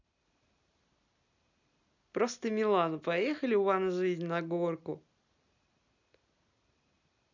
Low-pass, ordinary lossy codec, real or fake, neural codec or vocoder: 7.2 kHz; none; real; none